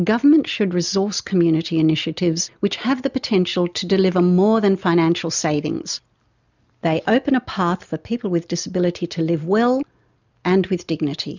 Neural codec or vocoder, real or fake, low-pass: none; real; 7.2 kHz